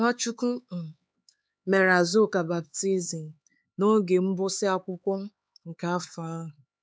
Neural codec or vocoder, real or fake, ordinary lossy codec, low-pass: codec, 16 kHz, 4 kbps, X-Codec, HuBERT features, trained on LibriSpeech; fake; none; none